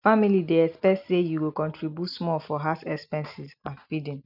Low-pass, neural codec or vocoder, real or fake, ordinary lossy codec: 5.4 kHz; none; real; none